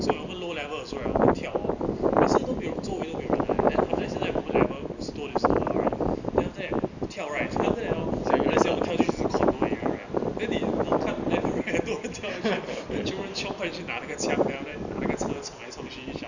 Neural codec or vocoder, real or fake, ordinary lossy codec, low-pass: none; real; none; 7.2 kHz